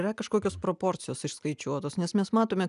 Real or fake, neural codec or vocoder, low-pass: real; none; 10.8 kHz